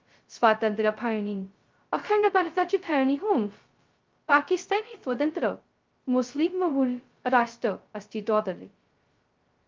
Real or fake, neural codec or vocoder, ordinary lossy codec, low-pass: fake; codec, 16 kHz, 0.2 kbps, FocalCodec; Opus, 32 kbps; 7.2 kHz